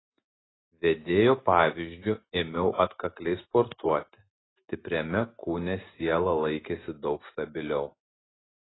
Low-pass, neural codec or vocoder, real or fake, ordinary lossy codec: 7.2 kHz; none; real; AAC, 16 kbps